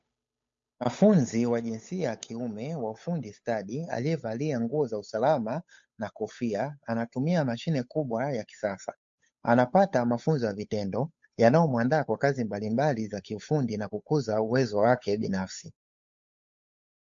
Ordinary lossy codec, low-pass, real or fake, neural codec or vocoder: MP3, 48 kbps; 7.2 kHz; fake; codec, 16 kHz, 8 kbps, FunCodec, trained on Chinese and English, 25 frames a second